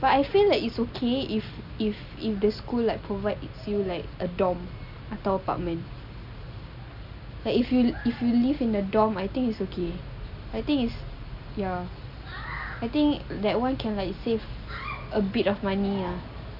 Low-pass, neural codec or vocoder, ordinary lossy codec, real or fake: 5.4 kHz; none; none; real